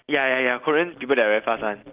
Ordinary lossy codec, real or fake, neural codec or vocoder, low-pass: Opus, 32 kbps; real; none; 3.6 kHz